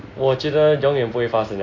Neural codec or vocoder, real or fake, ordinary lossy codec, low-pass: none; real; AAC, 32 kbps; 7.2 kHz